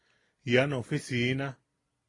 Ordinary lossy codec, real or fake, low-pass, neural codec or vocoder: AAC, 32 kbps; real; 10.8 kHz; none